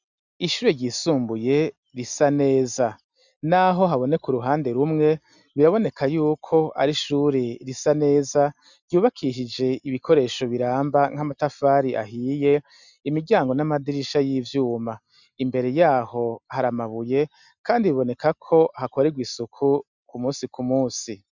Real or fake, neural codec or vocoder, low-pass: real; none; 7.2 kHz